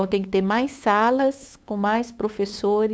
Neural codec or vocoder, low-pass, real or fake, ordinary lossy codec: codec, 16 kHz, 8 kbps, FunCodec, trained on LibriTTS, 25 frames a second; none; fake; none